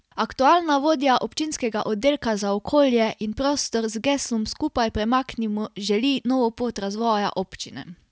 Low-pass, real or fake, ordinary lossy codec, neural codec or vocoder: none; real; none; none